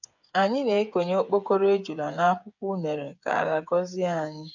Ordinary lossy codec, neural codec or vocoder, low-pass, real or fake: none; codec, 16 kHz, 8 kbps, FreqCodec, smaller model; 7.2 kHz; fake